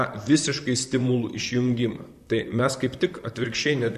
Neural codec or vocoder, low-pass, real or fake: vocoder, 44.1 kHz, 128 mel bands, Pupu-Vocoder; 14.4 kHz; fake